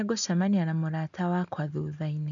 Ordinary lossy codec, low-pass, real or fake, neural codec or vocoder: none; 7.2 kHz; real; none